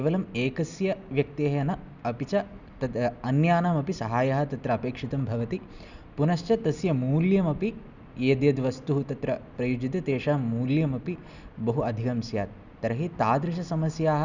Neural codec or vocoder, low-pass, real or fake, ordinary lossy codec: none; 7.2 kHz; real; none